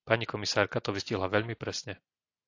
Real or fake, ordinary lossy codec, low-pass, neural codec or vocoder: real; AAC, 48 kbps; 7.2 kHz; none